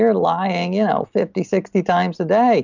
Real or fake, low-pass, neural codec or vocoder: real; 7.2 kHz; none